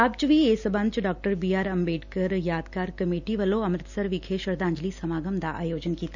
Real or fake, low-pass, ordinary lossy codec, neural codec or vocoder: real; 7.2 kHz; none; none